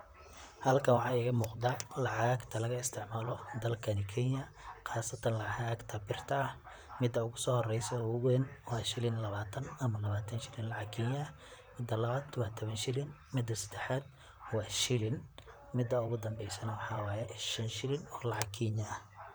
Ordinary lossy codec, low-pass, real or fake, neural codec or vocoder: none; none; fake; vocoder, 44.1 kHz, 128 mel bands, Pupu-Vocoder